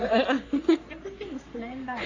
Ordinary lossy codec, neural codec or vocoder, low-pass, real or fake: AAC, 32 kbps; codec, 44.1 kHz, 3.4 kbps, Pupu-Codec; 7.2 kHz; fake